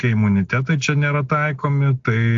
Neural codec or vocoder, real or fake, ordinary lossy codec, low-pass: none; real; AAC, 64 kbps; 7.2 kHz